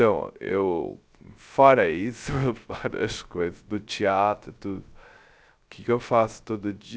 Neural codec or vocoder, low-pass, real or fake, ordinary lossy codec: codec, 16 kHz, 0.3 kbps, FocalCodec; none; fake; none